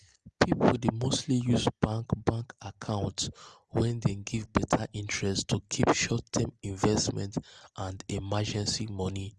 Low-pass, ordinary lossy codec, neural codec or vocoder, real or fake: 10.8 kHz; Opus, 24 kbps; none; real